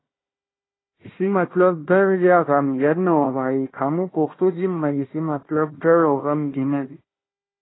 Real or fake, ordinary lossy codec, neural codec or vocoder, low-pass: fake; AAC, 16 kbps; codec, 16 kHz, 1 kbps, FunCodec, trained on Chinese and English, 50 frames a second; 7.2 kHz